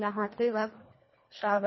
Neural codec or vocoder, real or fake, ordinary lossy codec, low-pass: codec, 24 kHz, 1.5 kbps, HILCodec; fake; MP3, 24 kbps; 7.2 kHz